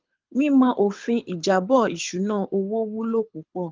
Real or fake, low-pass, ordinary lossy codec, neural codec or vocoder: fake; 7.2 kHz; Opus, 32 kbps; codec, 24 kHz, 6 kbps, HILCodec